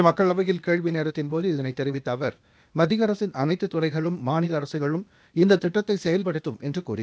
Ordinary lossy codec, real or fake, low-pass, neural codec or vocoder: none; fake; none; codec, 16 kHz, 0.8 kbps, ZipCodec